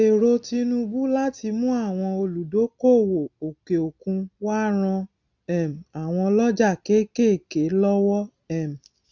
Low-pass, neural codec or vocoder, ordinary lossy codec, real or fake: 7.2 kHz; none; none; real